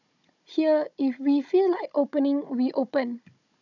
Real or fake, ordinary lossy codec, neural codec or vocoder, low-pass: fake; none; codec, 16 kHz, 16 kbps, FunCodec, trained on Chinese and English, 50 frames a second; 7.2 kHz